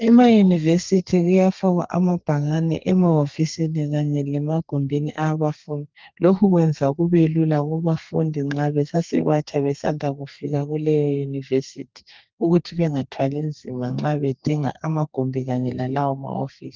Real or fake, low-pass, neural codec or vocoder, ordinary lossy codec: fake; 7.2 kHz; codec, 32 kHz, 1.9 kbps, SNAC; Opus, 24 kbps